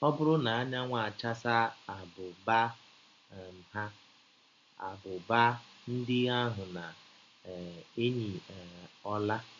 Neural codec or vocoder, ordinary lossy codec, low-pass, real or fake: none; MP3, 48 kbps; 7.2 kHz; real